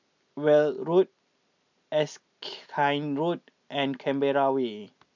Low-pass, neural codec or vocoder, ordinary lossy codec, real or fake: 7.2 kHz; none; none; real